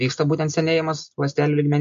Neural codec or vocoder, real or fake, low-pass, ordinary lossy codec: none; real; 7.2 kHz; MP3, 48 kbps